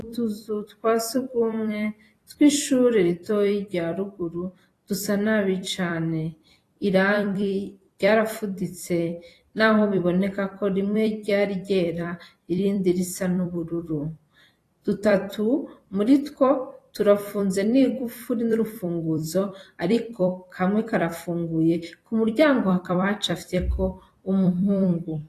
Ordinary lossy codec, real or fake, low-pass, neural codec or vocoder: AAC, 48 kbps; fake; 14.4 kHz; vocoder, 44.1 kHz, 128 mel bands every 256 samples, BigVGAN v2